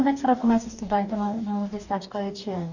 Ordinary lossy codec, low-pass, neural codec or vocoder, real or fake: none; 7.2 kHz; codec, 44.1 kHz, 2.6 kbps, DAC; fake